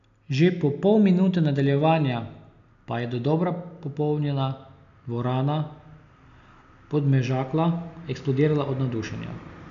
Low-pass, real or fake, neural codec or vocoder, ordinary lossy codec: 7.2 kHz; real; none; none